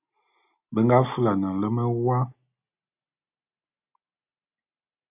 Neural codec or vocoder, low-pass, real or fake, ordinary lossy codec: none; 3.6 kHz; real; AAC, 32 kbps